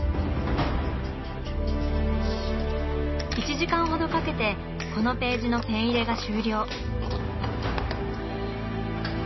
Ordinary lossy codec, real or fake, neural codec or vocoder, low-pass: MP3, 24 kbps; real; none; 7.2 kHz